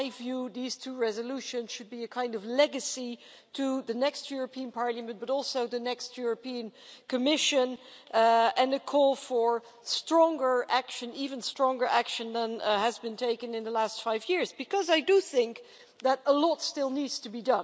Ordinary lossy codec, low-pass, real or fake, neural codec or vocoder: none; none; real; none